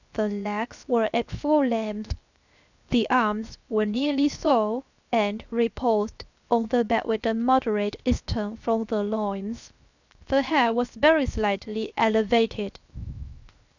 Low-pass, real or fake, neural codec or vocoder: 7.2 kHz; fake; codec, 16 kHz, 0.7 kbps, FocalCodec